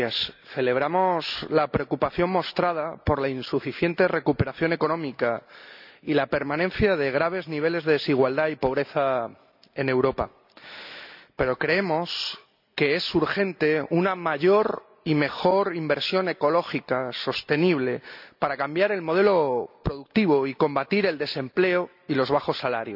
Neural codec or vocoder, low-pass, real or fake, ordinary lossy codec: none; 5.4 kHz; real; none